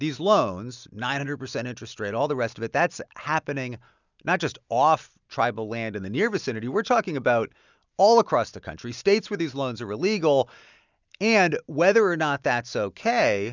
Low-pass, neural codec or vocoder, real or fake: 7.2 kHz; none; real